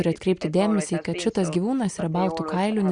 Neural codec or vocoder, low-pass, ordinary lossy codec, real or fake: none; 9.9 kHz; Opus, 64 kbps; real